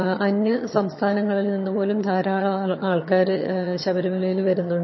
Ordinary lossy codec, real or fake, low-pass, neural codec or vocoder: MP3, 24 kbps; fake; 7.2 kHz; vocoder, 22.05 kHz, 80 mel bands, HiFi-GAN